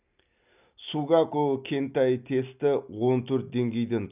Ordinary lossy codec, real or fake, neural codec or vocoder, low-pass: none; real; none; 3.6 kHz